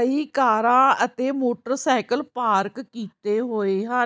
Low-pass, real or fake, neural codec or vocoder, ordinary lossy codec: none; real; none; none